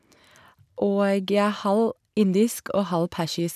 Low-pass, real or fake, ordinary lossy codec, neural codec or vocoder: 14.4 kHz; real; none; none